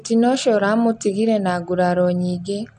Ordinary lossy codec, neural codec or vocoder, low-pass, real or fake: none; none; 9.9 kHz; real